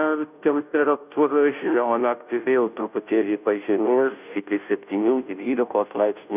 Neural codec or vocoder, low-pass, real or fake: codec, 16 kHz, 0.5 kbps, FunCodec, trained on Chinese and English, 25 frames a second; 3.6 kHz; fake